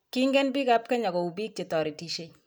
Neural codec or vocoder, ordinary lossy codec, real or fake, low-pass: none; none; real; none